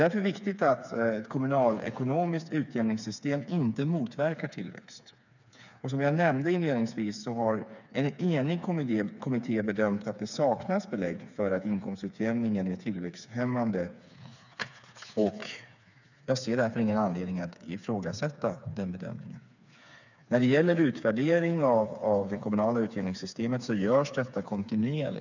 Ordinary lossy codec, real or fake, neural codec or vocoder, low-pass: none; fake; codec, 16 kHz, 4 kbps, FreqCodec, smaller model; 7.2 kHz